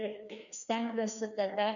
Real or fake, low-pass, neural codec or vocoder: fake; 7.2 kHz; codec, 16 kHz, 2 kbps, FreqCodec, larger model